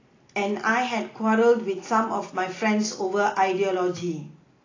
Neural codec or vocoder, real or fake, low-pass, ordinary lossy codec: none; real; 7.2 kHz; AAC, 32 kbps